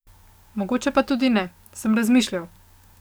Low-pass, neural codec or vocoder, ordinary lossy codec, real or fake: none; codec, 44.1 kHz, 7.8 kbps, DAC; none; fake